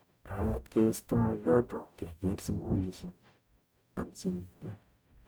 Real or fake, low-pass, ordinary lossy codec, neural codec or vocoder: fake; none; none; codec, 44.1 kHz, 0.9 kbps, DAC